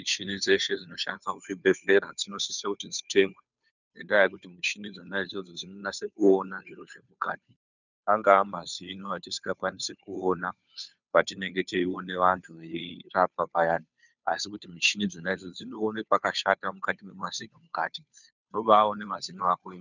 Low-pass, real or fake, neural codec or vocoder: 7.2 kHz; fake; codec, 16 kHz, 2 kbps, FunCodec, trained on Chinese and English, 25 frames a second